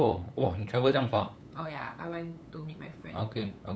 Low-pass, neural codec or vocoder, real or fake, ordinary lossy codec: none; codec, 16 kHz, 8 kbps, FunCodec, trained on LibriTTS, 25 frames a second; fake; none